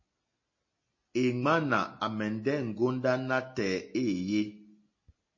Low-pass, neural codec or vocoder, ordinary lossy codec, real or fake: 7.2 kHz; none; MP3, 32 kbps; real